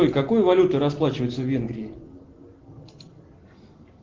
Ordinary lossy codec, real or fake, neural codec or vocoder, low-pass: Opus, 16 kbps; real; none; 7.2 kHz